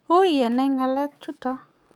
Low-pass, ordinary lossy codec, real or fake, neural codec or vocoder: 19.8 kHz; none; fake; codec, 44.1 kHz, 7.8 kbps, DAC